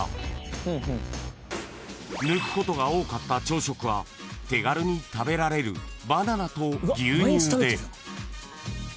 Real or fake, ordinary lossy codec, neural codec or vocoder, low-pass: real; none; none; none